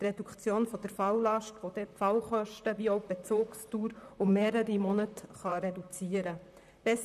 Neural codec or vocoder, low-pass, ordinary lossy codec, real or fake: vocoder, 44.1 kHz, 128 mel bands, Pupu-Vocoder; 14.4 kHz; none; fake